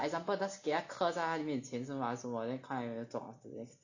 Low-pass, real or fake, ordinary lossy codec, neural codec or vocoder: 7.2 kHz; real; none; none